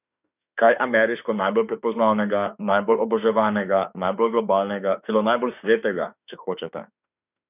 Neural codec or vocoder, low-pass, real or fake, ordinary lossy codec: autoencoder, 48 kHz, 32 numbers a frame, DAC-VAE, trained on Japanese speech; 3.6 kHz; fake; none